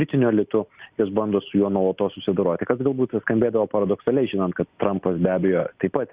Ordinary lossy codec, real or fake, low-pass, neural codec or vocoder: Opus, 64 kbps; real; 3.6 kHz; none